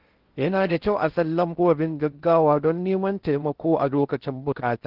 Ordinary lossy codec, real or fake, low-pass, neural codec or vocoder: Opus, 16 kbps; fake; 5.4 kHz; codec, 16 kHz in and 24 kHz out, 0.6 kbps, FocalCodec, streaming, 2048 codes